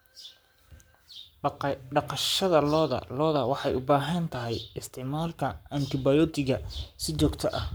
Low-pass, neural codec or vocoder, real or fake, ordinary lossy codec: none; codec, 44.1 kHz, 7.8 kbps, Pupu-Codec; fake; none